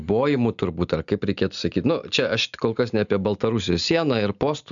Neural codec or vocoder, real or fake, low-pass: none; real; 7.2 kHz